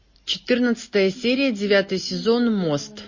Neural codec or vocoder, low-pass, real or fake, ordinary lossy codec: none; 7.2 kHz; real; MP3, 32 kbps